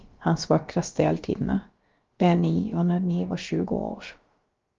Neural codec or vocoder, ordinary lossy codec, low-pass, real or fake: codec, 16 kHz, about 1 kbps, DyCAST, with the encoder's durations; Opus, 32 kbps; 7.2 kHz; fake